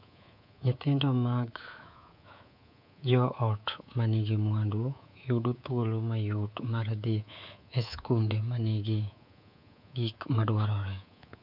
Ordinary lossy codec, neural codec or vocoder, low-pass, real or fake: none; codec, 24 kHz, 3.1 kbps, DualCodec; 5.4 kHz; fake